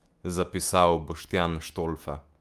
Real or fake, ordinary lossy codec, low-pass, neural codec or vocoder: fake; Opus, 32 kbps; 14.4 kHz; autoencoder, 48 kHz, 128 numbers a frame, DAC-VAE, trained on Japanese speech